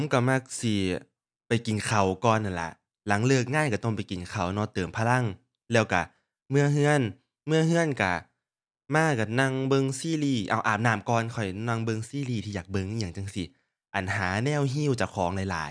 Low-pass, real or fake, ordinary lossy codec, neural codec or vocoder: 9.9 kHz; real; none; none